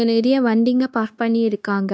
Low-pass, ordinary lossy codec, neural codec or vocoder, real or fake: none; none; codec, 16 kHz, 0.9 kbps, LongCat-Audio-Codec; fake